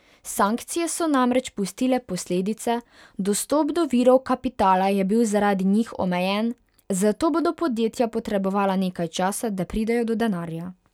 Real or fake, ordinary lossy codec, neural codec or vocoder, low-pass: real; none; none; 19.8 kHz